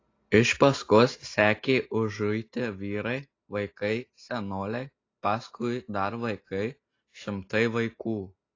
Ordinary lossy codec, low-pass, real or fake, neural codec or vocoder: AAC, 32 kbps; 7.2 kHz; real; none